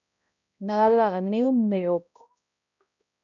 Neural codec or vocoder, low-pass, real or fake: codec, 16 kHz, 0.5 kbps, X-Codec, HuBERT features, trained on balanced general audio; 7.2 kHz; fake